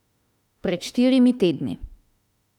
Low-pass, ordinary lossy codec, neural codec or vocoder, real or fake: 19.8 kHz; none; autoencoder, 48 kHz, 32 numbers a frame, DAC-VAE, trained on Japanese speech; fake